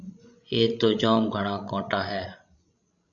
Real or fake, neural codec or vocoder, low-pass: fake; codec, 16 kHz, 16 kbps, FreqCodec, larger model; 7.2 kHz